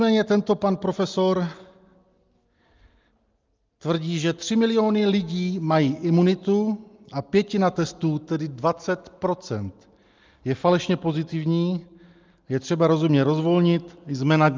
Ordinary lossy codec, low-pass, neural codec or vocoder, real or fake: Opus, 24 kbps; 7.2 kHz; none; real